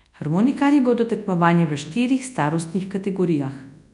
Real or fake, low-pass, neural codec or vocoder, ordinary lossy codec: fake; 10.8 kHz; codec, 24 kHz, 0.9 kbps, WavTokenizer, large speech release; none